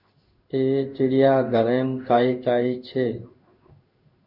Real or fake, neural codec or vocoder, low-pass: fake; codec, 16 kHz in and 24 kHz out, 1 kbps, XY-Tokenizer; 5.4 kHz